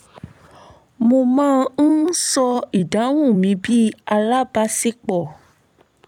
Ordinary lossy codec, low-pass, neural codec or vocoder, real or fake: none; 19.8 kHz; vocoder, 44.1 kHz, 128 mel bands every 256 samples, BigVGAN v2; fake